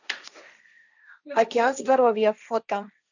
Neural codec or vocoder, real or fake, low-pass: codec, 16 kHz, 1.1 kbps, Voila-Tokenizer; fake; 7.2 kHz